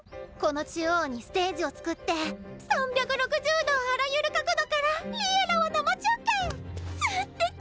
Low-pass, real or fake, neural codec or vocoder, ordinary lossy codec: none; real; none; none